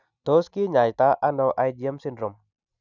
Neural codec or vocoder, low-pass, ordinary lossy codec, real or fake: none; 7.2 kHz; none; real